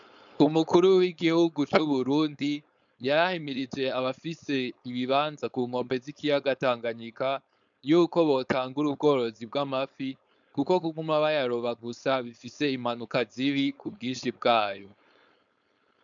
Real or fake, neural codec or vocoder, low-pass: fake; codec, 16 kHz, 4.8 kbps, FACodec; 7.2 kHz